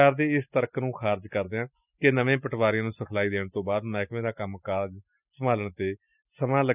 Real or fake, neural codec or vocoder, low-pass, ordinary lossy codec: real; none; 3.6 kHz; none